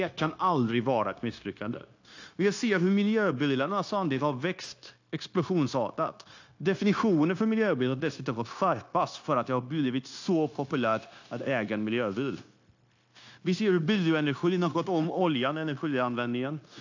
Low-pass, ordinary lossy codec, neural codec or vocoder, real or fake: 7.2 kHz; AAC, 48 kbps; codec, 16 kHz, 0.9 kbps, LongCat-Audio-Codec; fake